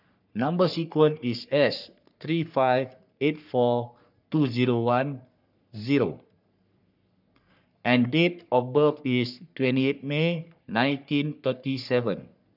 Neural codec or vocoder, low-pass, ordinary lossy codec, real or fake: codec, 44.1 kHz, 3.4 kbps, Pupu-Codec; 5.4 kHz; none; fake